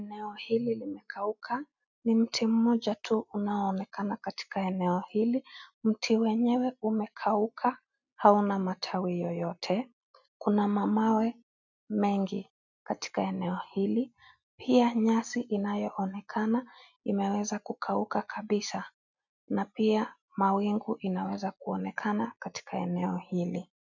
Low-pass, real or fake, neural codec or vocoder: 7.2 kHz; real; none